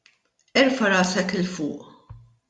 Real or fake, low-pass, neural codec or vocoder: real; 10.8 kHz; none